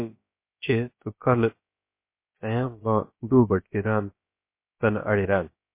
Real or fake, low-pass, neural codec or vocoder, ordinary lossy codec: fake; 3.6 kHz; codec, 16 kHz, about 1 kbps, DyCAST, with the encoder's durations; MP3, 24 kbps